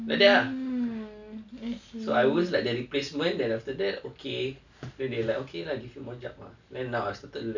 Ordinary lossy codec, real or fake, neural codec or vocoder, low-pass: none; real; none; 7.2 kHz